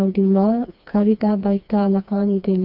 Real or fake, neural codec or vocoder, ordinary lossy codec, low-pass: fake; codec, 16 kHz, 2 kbps, FreqCodec, smaller model; none; 5.4 kHz